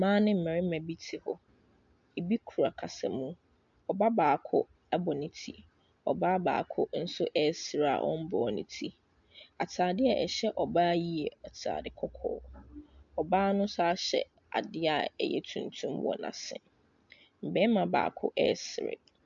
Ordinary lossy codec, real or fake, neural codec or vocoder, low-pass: MP3, 64 kbps; real; none; 7.2 kHz